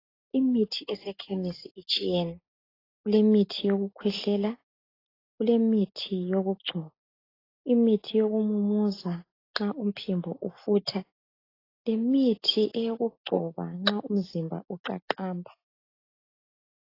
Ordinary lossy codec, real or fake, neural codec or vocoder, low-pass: AAC, 24 kbps; real; none; 5.4 kHz